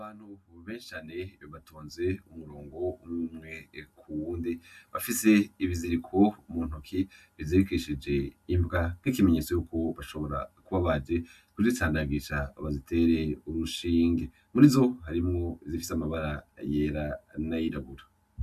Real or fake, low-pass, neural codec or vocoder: real; 14.4 kHz; none